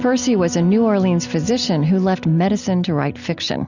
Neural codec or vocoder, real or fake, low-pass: none; real; 7.2 kHz